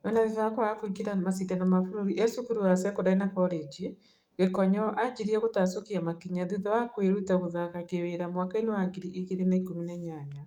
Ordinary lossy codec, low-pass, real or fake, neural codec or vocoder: none; 19.8 kHz; fake; codec, 44.1 kHz, 7.8 kbps, DAC